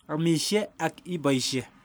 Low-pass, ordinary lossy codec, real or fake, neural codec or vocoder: none; none; real; none